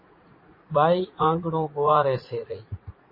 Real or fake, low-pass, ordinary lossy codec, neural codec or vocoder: fake; 5.4 kHz; MP3, 24 kbps; vocoder, 44.1 kHz, 80 mel bands, Vocos